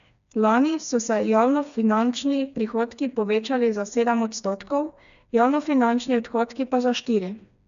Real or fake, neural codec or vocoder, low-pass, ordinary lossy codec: fake; codec, 16 kHz, 2 kbps, FreqCodec, smaller model; 7.2 kHz; none